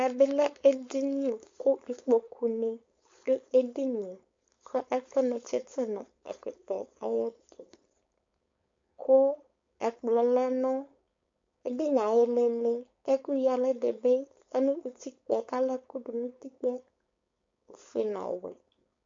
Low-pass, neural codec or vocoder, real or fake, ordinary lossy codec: 7.2 kHz; codec, 16 kHz, 4.8 kbps, FACodec; fake; MP3, 48 kbps